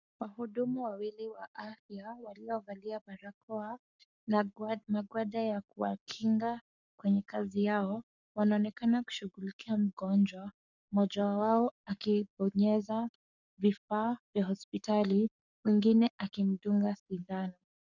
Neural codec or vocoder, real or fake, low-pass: codec, 44.1 kHz, 7.8 kbps, Pupu-Codec; fake; 7.2 kHz